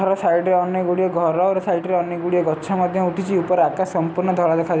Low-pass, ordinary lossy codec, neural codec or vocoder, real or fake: none; none; none; real